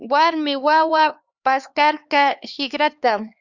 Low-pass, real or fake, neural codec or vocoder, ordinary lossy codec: 7.2 kHz; fake; codec, 16 kHz, 2 kbps, X-Codec, HuBERT features, trained on LibriSpeech; Opus, 64 kbps